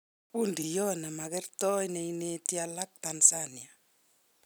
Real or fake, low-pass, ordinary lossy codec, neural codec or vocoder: real; none; none; none